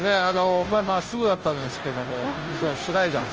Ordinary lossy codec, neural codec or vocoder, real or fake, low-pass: Opus, 24 kbps; codec, 16 kHz, 0.5 kbps, FunCodec, trained on Chinese and English, 25 frames a second; fake; 7.2 kHz